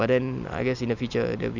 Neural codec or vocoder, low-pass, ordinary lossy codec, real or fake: none; 7.2 kHz; none; real